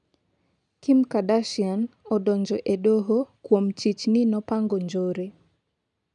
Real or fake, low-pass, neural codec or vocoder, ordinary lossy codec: real; 10.8 kHz; none; none